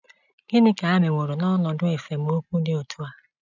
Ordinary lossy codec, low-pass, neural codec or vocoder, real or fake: none; 7.2 kHz; none; real